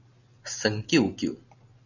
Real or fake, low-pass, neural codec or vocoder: real; 7.2 kHz; none